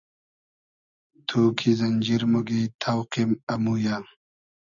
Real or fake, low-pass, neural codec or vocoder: real; 7.2 kHz; none